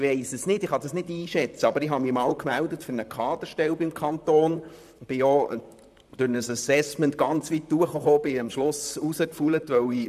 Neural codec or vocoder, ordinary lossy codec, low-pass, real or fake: vocoder, 44.1 kHz, 128 mel bands, Pupu-Vocoder; none; 14.4 kHz; fake